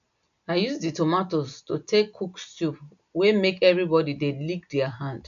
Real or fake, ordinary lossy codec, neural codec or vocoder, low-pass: real; none; none; 7.2 kHz